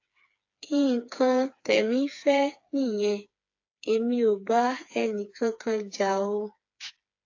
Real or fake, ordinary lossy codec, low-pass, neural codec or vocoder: fake; AAC, 48 kbps; 7.2 kHz; codec, 16 kHz, 4 kbps, FreqCodec, smaller model